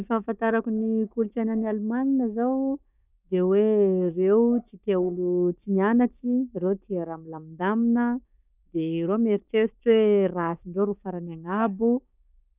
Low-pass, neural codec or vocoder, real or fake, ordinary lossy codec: 3.6 kHz; codec, 16 kHz, 6 kbps, DAC; fake; none